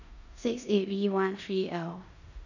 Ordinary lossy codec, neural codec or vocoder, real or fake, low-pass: none; codec, 16 kHz in and 24 kHz out, 0.9 kbps, LongCat-Audio-Codec, four codebook decoder; fake; 7.2 kHz